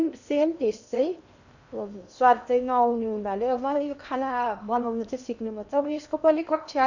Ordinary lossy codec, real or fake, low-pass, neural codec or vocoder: none; fake; 7.2 kHz; codec, 16 kHz in and 24 kHz out, 0.8 kbps, FocalCodec, streaming, 65536 codes